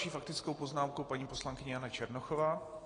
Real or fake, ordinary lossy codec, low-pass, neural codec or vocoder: real; AAC, 32 kbps; 9.9 kHz; none